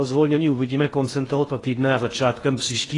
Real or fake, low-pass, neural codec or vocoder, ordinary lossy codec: fake; 10.8 kHz; codec, 16 kHz in and 24 kHz out, 0.6 kbps, FocalCodec, streaming, 4096 codes; AAC, 32 kbps